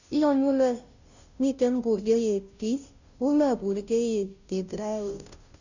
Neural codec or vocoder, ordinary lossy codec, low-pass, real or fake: codec, 16 kHz, 0.5 kbps, FunCodec, trained on Chinese and English, 25 frames a second; none; 7.2 kHz; fake